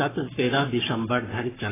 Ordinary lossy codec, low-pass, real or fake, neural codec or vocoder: AAC, 16 kbps; 3.6 kHz; fake; codec, 44.1 kHz, 7.8 kbps, Pupu-Codec